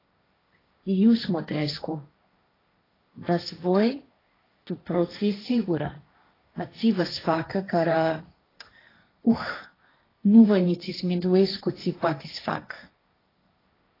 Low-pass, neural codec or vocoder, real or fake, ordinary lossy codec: 5.4 kHz; codec, 16 kHz, 1.1 kbps, Voila-Tokenizer; fake; AAC, 24 kbps